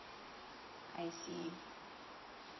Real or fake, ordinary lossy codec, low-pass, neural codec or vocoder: real; MP3, 24 kbps; 7.2 kHz; none